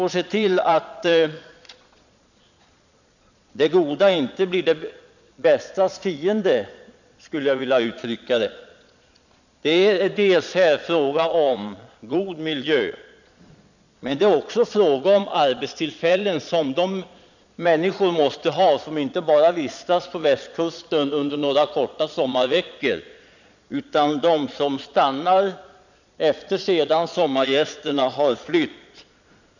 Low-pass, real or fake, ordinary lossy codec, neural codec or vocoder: 7.2 kHz; fake; none; vocoder, 22.05 kHz, 80 mel bands, Vocos